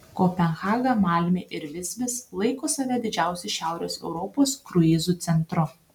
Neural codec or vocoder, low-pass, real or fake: none; 19.8 kHz; real